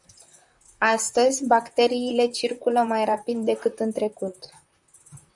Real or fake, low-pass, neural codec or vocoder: fake; 10.8 kHz; vocoder, 44.1 kHz, 128 mel bands, Pupu-Vocoder